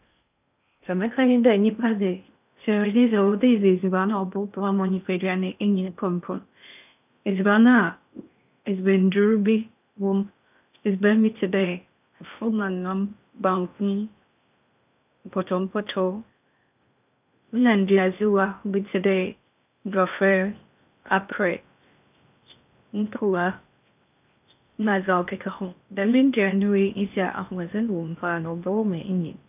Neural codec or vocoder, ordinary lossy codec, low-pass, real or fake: codec, 16 kHz in and 24 kHz out, 0.6 kbps, FocalCodec, streaming, 2048 codes; none; 3.6 kHz; fake